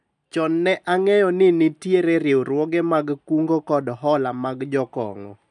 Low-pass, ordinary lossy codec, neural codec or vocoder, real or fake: 10.8 kHz; none; none; real